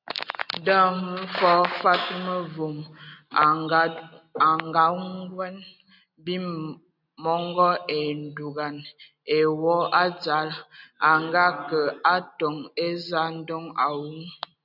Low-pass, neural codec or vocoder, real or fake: 5.4 kHz; none; real